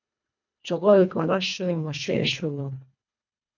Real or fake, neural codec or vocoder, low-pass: fake; codec, 24 kHz, 1.5 kbps, HILCodec; 7.2 kHz